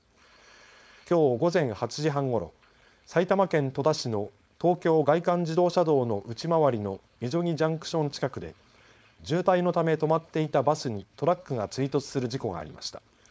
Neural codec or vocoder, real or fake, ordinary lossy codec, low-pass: codec, 16 kHz, 4.8 kbps, FACodec; fake; none; none